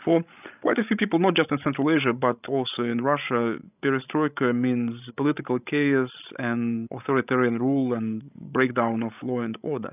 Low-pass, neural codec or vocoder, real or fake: 3.6 kHz; codec, 16 kHz, 16 kbps, FreqCodec, larger model; fake